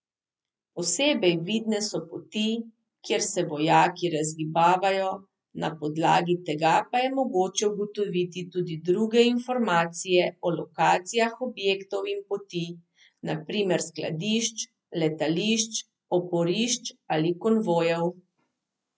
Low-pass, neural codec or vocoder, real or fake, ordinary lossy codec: none; none; real; none